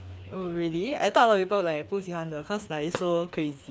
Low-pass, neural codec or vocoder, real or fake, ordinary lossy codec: none; codec, 16 kHz, 2 kbps, FreqCodec, larger model; fake; none